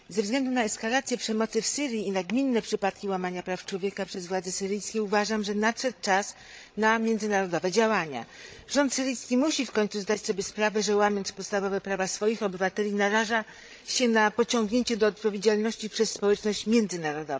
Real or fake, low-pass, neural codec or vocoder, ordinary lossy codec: fake; none; codec, 16 kHz, 16 kbps, FreqCodec, larger model; none